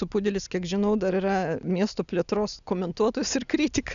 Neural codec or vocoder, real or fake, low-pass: none; real; 7.2 kHz